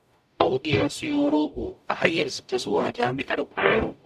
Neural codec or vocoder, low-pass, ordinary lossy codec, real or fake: codec, 44.1 kHz, 0.9 kbps, DAC; 14.4 kHz; MP3, 96 kbps; fake